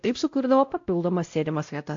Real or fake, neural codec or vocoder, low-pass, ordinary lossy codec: fake; codec, 16 kHz, 0.5 kbps, X-Codec, WavLM features, trained on Multilingual LibriSpeech; 7.2 kHz; AAC, 48 kbps